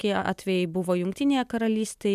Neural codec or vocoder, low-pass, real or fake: none; 14.4 kHz; real